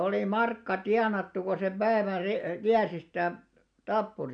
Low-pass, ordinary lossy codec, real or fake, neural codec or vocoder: 9.9 kHz; none; real; none